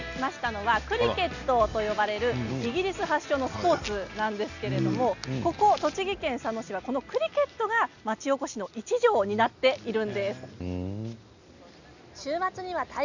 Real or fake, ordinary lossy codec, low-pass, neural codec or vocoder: real; none; 7.2 kHz; none